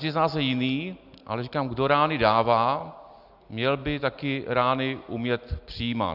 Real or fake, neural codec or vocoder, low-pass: real; none; 5.4 kHz